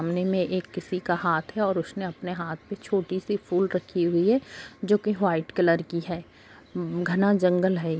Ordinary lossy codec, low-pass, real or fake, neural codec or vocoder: none; none; real; none